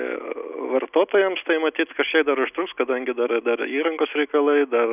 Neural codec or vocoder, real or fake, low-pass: none; real; 3.6 kHz